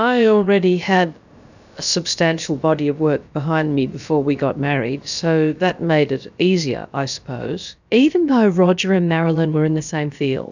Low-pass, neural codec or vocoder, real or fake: 7.2 kHz; codec, 16 kHz, about 1 kbps, DyCAST, with the encoder's durations; fake